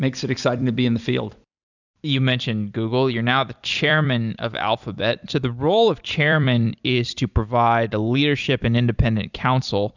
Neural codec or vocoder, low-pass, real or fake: vocoder, 44.1 kHz, 128 mel bands every 256 samples, BigVGAN v2; 7.2 kHz; fake